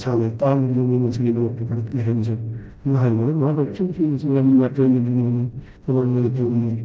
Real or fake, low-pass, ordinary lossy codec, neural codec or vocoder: fake; none; none; codec, 16 kHz, 0.5 kbps, FreqCodec, smaller model